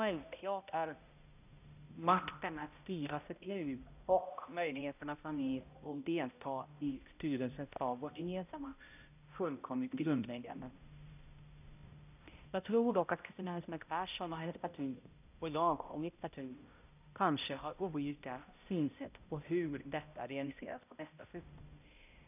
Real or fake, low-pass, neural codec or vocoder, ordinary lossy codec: fake; 3.6 kHz; codec, 16 kHz, 0.5 kbps, X-Codec, HuBERT features, trained on balanced general audio; none